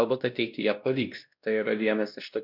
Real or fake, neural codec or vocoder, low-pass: fake; codec, 16 kHz, 1 kbps, X-Codec, WavLM features, trained on Multilingual LibriSpeech; 5.4 kHz